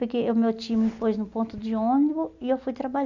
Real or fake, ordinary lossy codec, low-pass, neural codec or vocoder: real; none; 7.2 kHz; none